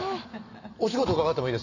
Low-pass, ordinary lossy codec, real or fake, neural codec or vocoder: 7.2 kHz; none; real; none